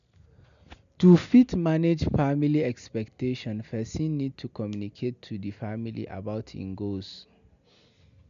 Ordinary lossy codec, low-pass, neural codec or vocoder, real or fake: MP3, 96 kbps; 7.2 kHz; none; real